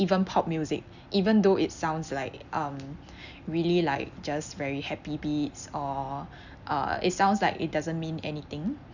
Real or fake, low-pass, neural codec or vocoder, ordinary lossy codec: real; 7.2 kHz; none; none